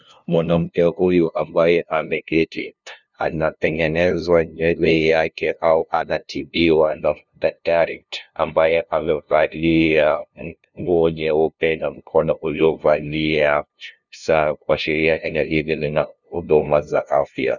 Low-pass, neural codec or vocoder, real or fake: 7.2 kHz; codec, 16 kHz, 0.5 kbps, FunCodec, trained on LibriTTS, 25 frames a second; fake